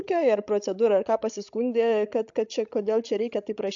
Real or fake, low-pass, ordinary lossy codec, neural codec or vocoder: fake; 7.2 kHz; AAC, 64 kbps; codec, 16 kHz, 16 kbps, FreqCodec, larger model